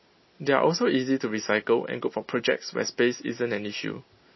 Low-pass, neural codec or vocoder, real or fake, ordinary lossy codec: 7.2 kHz; none; real; MP3, 24 kbps